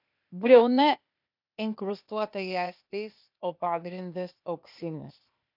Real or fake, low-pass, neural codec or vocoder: fake; 5.4 kHz; codec, 16 kHz, 0.8 kbps, ZipCodec